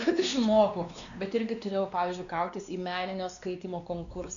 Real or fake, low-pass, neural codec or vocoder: fake; 7.2 kHz; codec, 16 kHz, 2 kbps, X-Codec, WavLM features, trained on Multilingual LibriSpeech